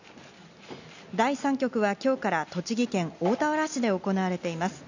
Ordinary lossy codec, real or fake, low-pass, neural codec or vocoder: none; real; 7.2 kHz; none